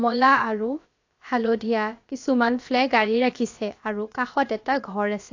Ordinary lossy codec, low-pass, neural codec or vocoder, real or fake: AAC, 48 kbps; 7.2 kHz; codec, 16 kHz, about 1 kbps, DyCAST, with the encoder's durations; fake